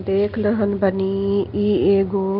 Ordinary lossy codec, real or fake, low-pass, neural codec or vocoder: Opus, 32 kbps; real; 5.4 kHz; none